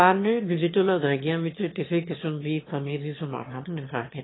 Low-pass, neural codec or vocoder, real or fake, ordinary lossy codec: 7.2 kHz; autoencoder, 22.05 kHz, a latent of 192 numbers a frame, VITS, trained on one speaker; fake; AAC, 16 kbps